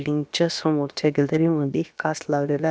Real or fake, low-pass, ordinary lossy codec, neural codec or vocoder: fake; none; none; codec, 16 kHz, about 1 kbps, DyCAST, with the encoder's durations